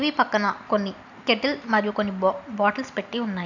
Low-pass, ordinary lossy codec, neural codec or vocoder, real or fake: 7.2 kHz; none; none; real